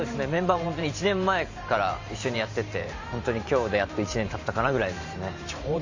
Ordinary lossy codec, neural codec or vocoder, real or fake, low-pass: AAC, 48 kbps; vocoder, 44.1 kHz, 128 mel bands every 512 samples, BigVGAN v2; fake; 7.2 kHz